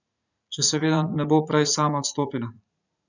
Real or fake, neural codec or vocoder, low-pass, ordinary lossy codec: fake; vocoder, 44.1 kHz, 80 mel bands, Vocos; 7.2 kHz; none